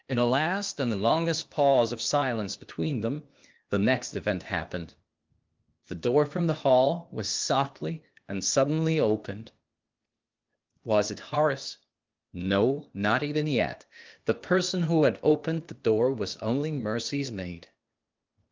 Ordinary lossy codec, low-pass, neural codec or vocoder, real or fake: Opus, 32 kbps; 7.2 kHz; codec, 16 kHz, 0.8 kbps, ZipCodec; fake